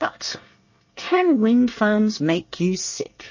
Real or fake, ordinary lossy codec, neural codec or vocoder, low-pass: fake; MP3, 32 kbps; codec, 24 kHz, 1 kbps, SNAC; 7.2 kHz